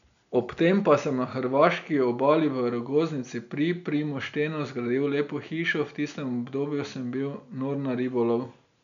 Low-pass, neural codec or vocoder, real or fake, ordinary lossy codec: 7.2 kHz; none; real; none